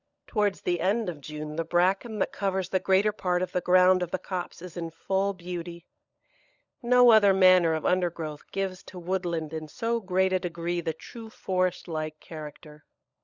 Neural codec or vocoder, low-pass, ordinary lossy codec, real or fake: codec, 16 kHz, 16 kbps, FunCodec, trained on LibriTTS, 50 frames a second; 7.2 kHz; Opus, 64 kbps; fake